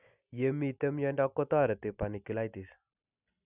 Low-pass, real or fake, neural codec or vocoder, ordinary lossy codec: 3.6 kHz; real; none; none